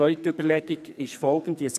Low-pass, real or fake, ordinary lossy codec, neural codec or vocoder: 14.4 kHz; fake; none; codec, 44.1 kHz, 2.6 kbps, SNAC